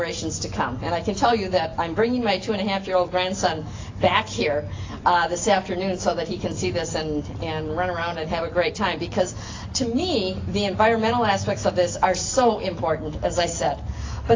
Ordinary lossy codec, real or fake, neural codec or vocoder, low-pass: AAC, 32 kbps; real; none; 7.2 kHz